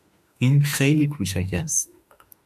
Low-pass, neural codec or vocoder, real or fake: 14.4 kHz; autoencoder, 48 kHz, 32 numbers a frame, DAC-VAE, trained on Japanese speech; fake